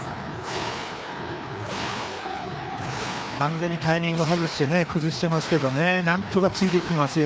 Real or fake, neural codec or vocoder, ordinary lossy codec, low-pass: fake; codec, 16 kHz, 2 kbps, FreqCodec, larger model; none; none